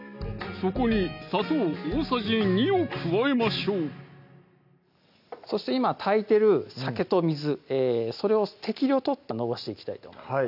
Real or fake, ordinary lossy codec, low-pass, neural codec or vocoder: real; none; 5.4 kHz; none